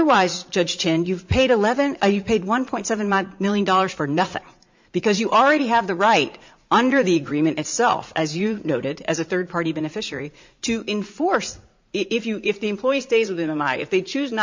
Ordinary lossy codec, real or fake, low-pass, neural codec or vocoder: MP3, 64 kbps; fake; 7.2 kHz; vocoder, 44.1 kHz, 80 mel bands, Vocos